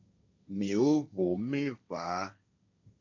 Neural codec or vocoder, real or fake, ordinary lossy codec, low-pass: codec, 16 kHz, 1.1 kbps, Voila-Tokenizer; fake; MP3, 48 kbps; 7.2 kHz